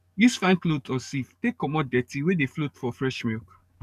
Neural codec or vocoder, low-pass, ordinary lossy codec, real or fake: codec, 44.1 kHz, 7.8 kbps, DAC; 14.4 kHz; none; fake